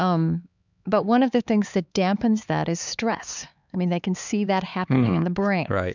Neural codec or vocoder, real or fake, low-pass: codec, 16 kHz, 4 kbps, X-Codec, HuBERT features, trained on LibriSpeech; fake; 7.2 kHz